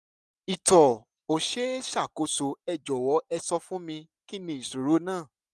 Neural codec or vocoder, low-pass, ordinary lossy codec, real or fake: none; none; none; real